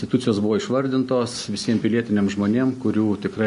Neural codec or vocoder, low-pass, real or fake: none; 10.8 kHz; real